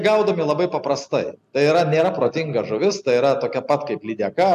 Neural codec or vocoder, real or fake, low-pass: none; real; 14.4 kHz